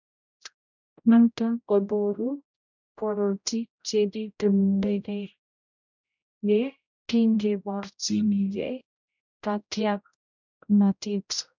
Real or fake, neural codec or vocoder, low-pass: fake; codec, 16 kHz, 0.5 kbps, X-Codec, HuBERT features, trained on general audio; 7.2 kHz